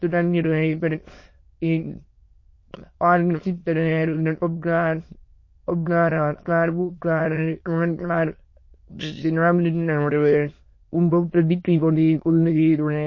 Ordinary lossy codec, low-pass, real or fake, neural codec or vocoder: MP3, 32 kbps; 7.2 kHz; fake; autoencoder, 22.05 kHz, a latent of 192 numbers a frame, VITS, trained on many speakers